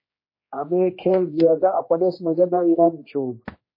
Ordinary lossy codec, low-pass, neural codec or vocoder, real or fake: MP3, 24 kbps; 5.4 kHz; codec, 16 kHz, 1 kbps, X-Codec, HuBERT features, trained on general audio; fake